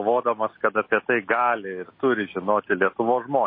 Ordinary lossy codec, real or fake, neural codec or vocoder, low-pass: MP3, 24 kbps; real; none; 5.4 kHz